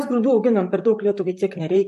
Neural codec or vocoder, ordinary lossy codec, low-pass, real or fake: vocoder, 44.1 kHz, 128 mel bands, Pupu-Vocoder; MP3, 64 kbps; 14.4 kHz; fake